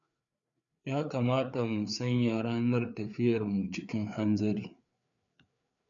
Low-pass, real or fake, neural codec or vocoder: 7.2 kHz; fake; codec, 16 kHz, 4 kbps, FreqCodec, larger model